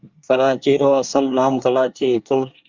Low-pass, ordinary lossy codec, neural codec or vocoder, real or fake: 7.2 kHz; Opus, 32 kbps; codec, 24 kHz, 1 kbps, SNAC; fake